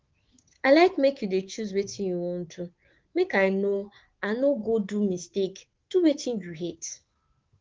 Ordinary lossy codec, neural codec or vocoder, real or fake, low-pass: Opus, 16 kbps; codec, 24 kHz, 3.1 kbps, DualCodec; fake; 7.2 kHz